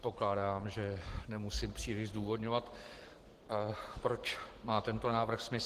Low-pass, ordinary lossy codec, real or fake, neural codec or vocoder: 14.4 kHz; Opus, 16 kbps; real; none